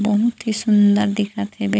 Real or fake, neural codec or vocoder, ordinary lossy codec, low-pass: fake; codec, 16 kHz, 16 kbps, FunCodec, trained on Chinese and English, 50 frames a second; none; none